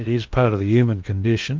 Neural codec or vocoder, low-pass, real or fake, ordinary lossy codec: codec, 16 kHz in and 24 kHz out, 0.8 kbps, FocalCodec, streaming, 65536 codes; 7.2 kHz; fake; Opus, 32 kbps